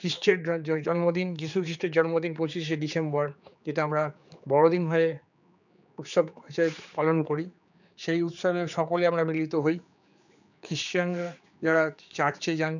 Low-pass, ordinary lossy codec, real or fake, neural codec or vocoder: 7.2 kHz; none; fake; codec, 16 kHz, 4 kbps, X-Codec, HuBERT features, trained on general audio